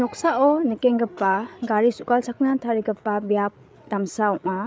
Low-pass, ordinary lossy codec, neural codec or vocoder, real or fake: none; none; codec, 16 kHz, 8 kbps, FreqCodec, larger model; fake